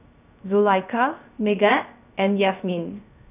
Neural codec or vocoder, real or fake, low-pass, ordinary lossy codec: codec, 16 kHz, 0.3 kbps, FocalCodec; fake; 3.6 kHz; none